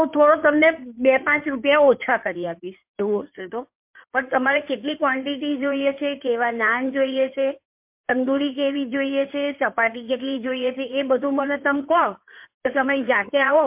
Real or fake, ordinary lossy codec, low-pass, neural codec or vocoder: fake; MP3, 32 kbps; 3.6 kHz; codec, 16 kHz in and 24 kHz out, 2.2 kbps, FireRedTTS-2 codec